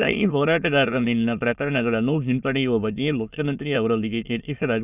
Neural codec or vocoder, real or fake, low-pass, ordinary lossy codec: autoencoder, 22.05 kHz, a latent of 192 numbers a frame, VITS, trained on many speakers; fake; 3.6 kHz; none